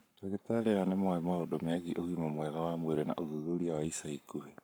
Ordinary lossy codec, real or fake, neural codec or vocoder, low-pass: none; fake; codec, 44.1 kHz, 7.8 kbps, Pupu-Codec; none